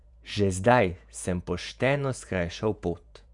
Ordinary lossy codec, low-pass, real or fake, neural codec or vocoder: none; 10.8 kHz; fake; vocoder, 48 kHz, 128 mel bands, Vocos